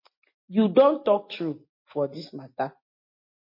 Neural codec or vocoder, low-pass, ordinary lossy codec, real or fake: none; 5.4 kHz; MP3, 32 kbps; real